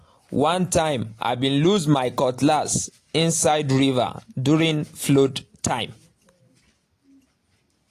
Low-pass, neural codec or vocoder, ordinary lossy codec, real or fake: 14.4 kHz; none; AAC, 48 kbps; real